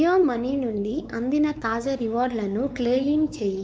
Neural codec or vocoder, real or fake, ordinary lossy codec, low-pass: codec, 16 kHz, 4 kbps, X-Codec, WavLM features, trained on Multilingual LibriSpeech; fake; none; none